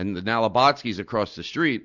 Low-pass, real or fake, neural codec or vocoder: 7.2 kHz; real; none